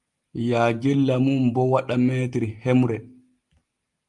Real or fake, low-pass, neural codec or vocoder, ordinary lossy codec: real; 10.8 kHz; none; Opus, 32 kbps